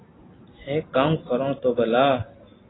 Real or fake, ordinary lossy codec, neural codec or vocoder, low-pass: real; AAC, 16 kbps; none; 7.2 kHz